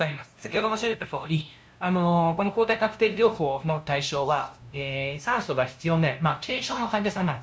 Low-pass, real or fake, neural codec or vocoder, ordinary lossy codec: none; fake; codec, 16 kHz, 0.5 kbps, FunCodec, trained on LibriTTS, 25 frames a second; none